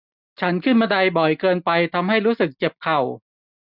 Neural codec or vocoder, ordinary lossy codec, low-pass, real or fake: none; none; 5.4 kHz; real